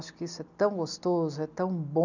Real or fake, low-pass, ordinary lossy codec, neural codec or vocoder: real; 7.2 kHz; none; none